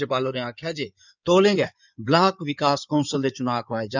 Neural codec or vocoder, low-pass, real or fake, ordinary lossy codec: codec, 16 kHz in and 24 kHz out, 2.2 kbps, FireRedTTS-2 codec; 7.2 kHz; fake; none